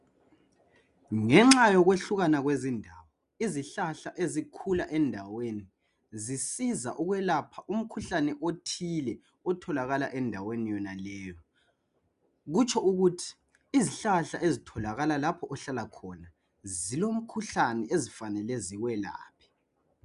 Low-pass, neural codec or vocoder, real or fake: 10.8 kHz; none; real